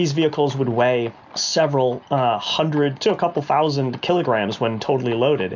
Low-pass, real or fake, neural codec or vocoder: 7.2 kHz; real; none